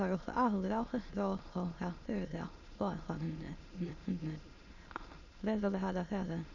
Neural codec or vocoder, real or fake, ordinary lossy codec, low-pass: autoencoder, 22.05 kHz, a latent of 192 numbers a frame, VITS, trained on many speakers; fake; none; 7.2 kHz